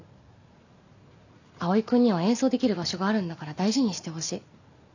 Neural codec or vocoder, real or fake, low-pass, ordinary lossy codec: none; real; 7.2 kHz; none